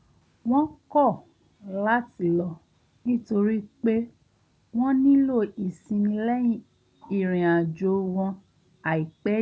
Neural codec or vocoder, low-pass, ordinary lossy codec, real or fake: none; none; none; real